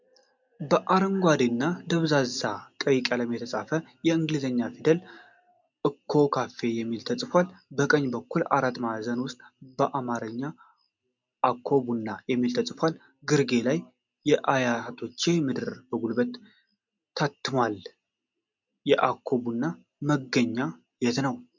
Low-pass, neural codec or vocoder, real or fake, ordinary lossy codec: 7.2 kHz; none; real; MP3, 64 kbps